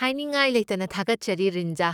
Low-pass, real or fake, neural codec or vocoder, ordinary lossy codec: 19.8 kHz; fake; codec, 44.1 kHz, 7.8 kbps, DAC; none